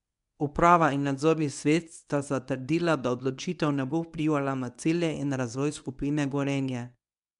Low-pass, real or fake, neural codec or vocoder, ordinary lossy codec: 10.8 kHz; fake; codec, 24 kHz, 0.9 kbps, WavTokenizer, medium speech release version 1; none